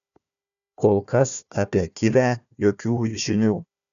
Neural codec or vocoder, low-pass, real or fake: codec, 16 kHz, 1 kbps, FunCodec, trained on Chinese and English, 50 frames a second; 7.2 kHz; fake